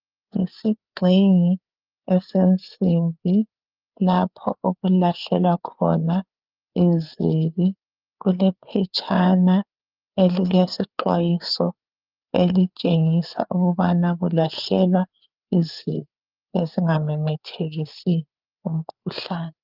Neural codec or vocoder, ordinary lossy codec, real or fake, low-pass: codec, 16 kHz, 4 kbps, FreqCodec, larger model; Opus, 24 kbps; fake; 5.4 kHz